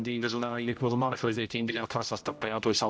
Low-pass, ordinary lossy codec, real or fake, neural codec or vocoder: none; none; fake; codec, 16 kHz, 0.5 kbps, X-Codec, HuBERT features, trained on general audio